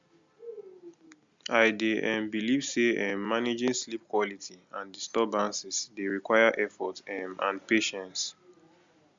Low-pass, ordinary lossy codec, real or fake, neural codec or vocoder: 7.2 kHz; Opus, 64 kbps; real; none